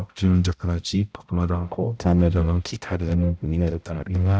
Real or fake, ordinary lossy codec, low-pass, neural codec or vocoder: fake; none; none; codec, 16 kHz, 0.5 kbps, X-Codec, HuBERT features, trained on balanced general audio